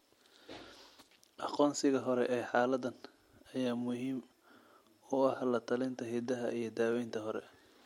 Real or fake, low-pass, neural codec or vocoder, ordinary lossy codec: real; 19.8 kHz; none; MP3, 64 kbps